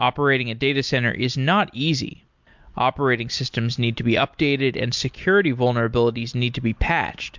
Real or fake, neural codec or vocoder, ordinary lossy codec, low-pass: real; none; MP3, 64 kbps; 7.2 kHz